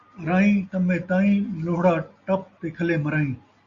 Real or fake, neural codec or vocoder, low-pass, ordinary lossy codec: real; none; 7.2 kHz; Opus, 64 kbps